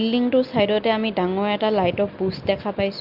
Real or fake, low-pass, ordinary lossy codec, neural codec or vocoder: real; 5.4 kHz; Opus, 32 kbps; none